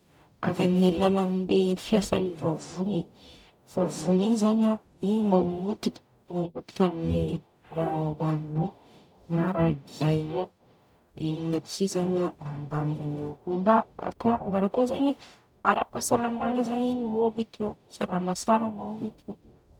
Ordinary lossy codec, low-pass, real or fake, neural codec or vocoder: none; 19.8 kHz; fake; codec, 44.1 kHz, 0.9 kbps, DAC